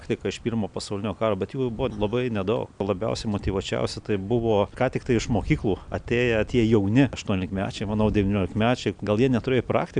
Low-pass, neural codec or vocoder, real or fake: 9.9 kHz; none; real